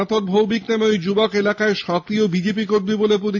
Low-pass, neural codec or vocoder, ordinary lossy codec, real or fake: 7.2 kHz; none; AAC, 48 kbps; real